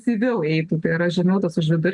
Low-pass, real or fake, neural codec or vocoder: 10.8 kHz; real; none